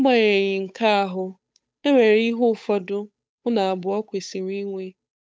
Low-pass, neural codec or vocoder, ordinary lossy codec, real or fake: none; codec, 16 kHz, 8 kbps, FunCodec, trained on Chinese and English, 25 frames a second; none; fake